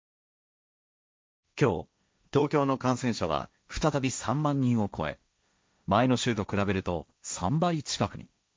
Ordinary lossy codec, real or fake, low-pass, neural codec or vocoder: none; fake; none; codec, 16 kHz, 1.1 kbps, Voila-Tokenizer